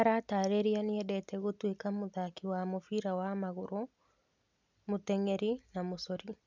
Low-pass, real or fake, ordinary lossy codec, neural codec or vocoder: 7.2 kHz; real; none; none